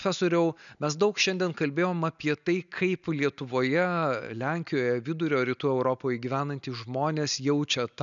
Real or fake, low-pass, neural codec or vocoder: real; 7.2 kHz; none